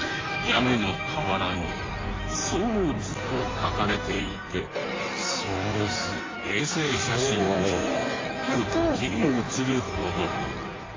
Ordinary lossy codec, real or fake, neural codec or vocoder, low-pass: AAC, 32 kbps; fake; codec, 16 kHz in and 24 kHz out, 1.1 kbps, FireRedTTS-2 codec; 7.2 kHz